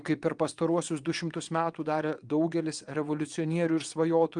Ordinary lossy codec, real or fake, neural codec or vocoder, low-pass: Opus, 32 kbps; fake; vocoder, 22.05 kHz, 80 mel bands, WaveNeXt; 9.9 kHz